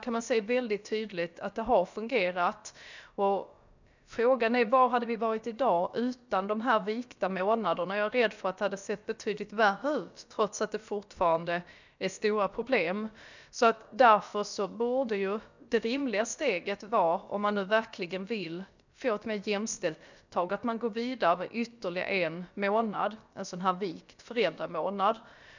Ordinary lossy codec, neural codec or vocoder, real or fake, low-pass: none; codec, 16 kHz, about 1 kbps, DyCAST, with the encoder's durations; fake; 7.2 kHz